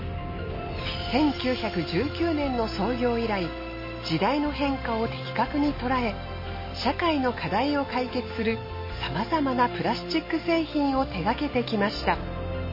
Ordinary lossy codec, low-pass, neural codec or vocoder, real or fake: MP3, 24 kbps; 5.4 kHz; none; real